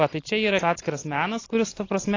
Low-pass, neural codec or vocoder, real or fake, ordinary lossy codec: 7.2 kHz; none; real; AAC, 32 kbps